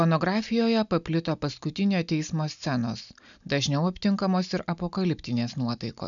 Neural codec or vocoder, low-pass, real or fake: none; 7.2 kHz; real